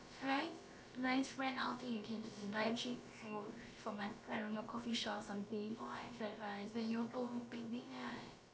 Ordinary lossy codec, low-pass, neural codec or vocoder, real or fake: none; none; codec, 16 kHz, about 1 kbps, DyCAST, with the encoder's durations; fake